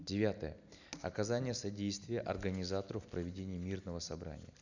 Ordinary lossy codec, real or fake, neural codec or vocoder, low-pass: none; real; none; 7.2 kHz